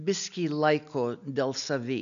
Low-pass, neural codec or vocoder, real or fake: 7.2 kHz; none; real